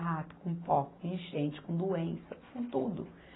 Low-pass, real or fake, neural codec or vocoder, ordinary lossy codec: 7.2 kHz; real; none; AAC, 16 kbps